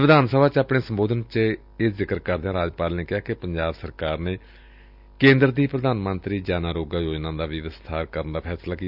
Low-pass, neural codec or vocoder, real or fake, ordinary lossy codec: 5.4 kHz; none; real; none